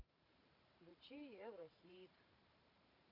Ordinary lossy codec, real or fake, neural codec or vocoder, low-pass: Opus, 64 kbps; fake; vocoder, 44.1 kHz, 128 mel bands every 512 samples, BigVGAN v2; 5.4 kHz